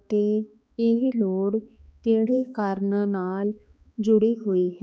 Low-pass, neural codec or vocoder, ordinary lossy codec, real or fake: none; codec, 16 kHz, 2 kbps, X-Codec, HuBERT features, trained on balanced general audio; none; fake